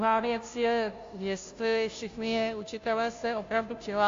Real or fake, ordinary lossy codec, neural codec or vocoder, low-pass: fake; AAC, 48 kbps; codec, 16 kHz, 0.5 kbps, FunCodec, trained on Chinese and English, 25 frames a second; 7.2 kHz